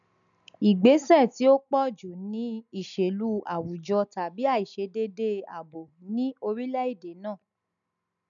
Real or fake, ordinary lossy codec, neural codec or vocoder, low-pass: real; none; none; 7.2 kHz